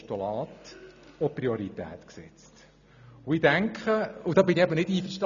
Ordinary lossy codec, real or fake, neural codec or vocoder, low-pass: none; real; none; 7.2 kHz